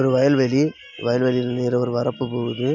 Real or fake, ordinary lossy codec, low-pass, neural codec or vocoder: real; none; 7.2 kHz; none